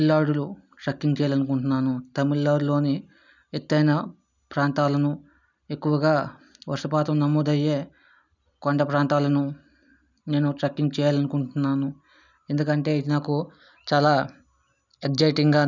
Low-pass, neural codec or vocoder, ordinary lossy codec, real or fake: 7.2 kHz; none; none; real